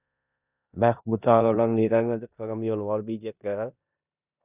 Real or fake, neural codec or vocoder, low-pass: fake; codec, 16 kHz in and 24 kHz out, 0.9 kbps, LongCat-Audio-Codec, four codebook decoder; 3.6 kHz